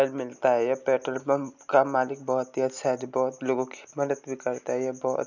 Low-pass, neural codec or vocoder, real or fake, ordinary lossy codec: 7.2 kHz; none; real; none